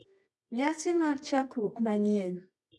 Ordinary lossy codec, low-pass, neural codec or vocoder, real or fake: none; none; codec, 24 kHz, 0.9 kbps, WavTokenizer, medium music audio release; fake